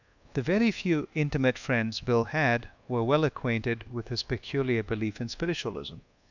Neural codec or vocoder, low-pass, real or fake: codec, 24 kHz, 1.2 kbps, DualCodec; 7.2 kHz; fake